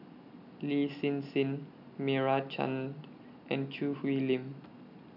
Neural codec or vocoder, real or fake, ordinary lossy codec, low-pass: none; real; none; 5.4 kHz